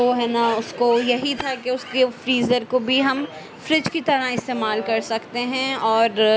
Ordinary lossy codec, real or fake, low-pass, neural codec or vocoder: none; real; none; none